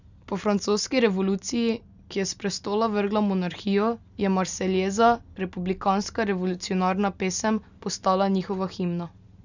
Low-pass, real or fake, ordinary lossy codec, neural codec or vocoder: 7.2 kHz; real; none; none